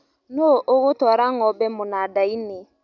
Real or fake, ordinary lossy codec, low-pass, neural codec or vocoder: real; none; 7.2 kHz; none